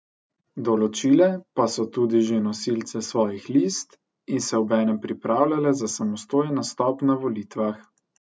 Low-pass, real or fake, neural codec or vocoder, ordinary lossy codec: none; real; none; none